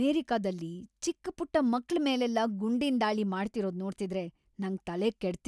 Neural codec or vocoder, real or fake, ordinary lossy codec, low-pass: none; real; none; none